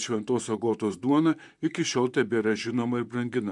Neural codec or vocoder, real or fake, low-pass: vocoder, 44.1 kHz, 128 mel bands, Pupu-Vocoder; fake; 10.8 kHz